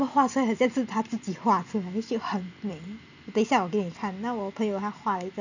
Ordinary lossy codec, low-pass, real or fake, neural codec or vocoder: none; 7.2 kHz; real; none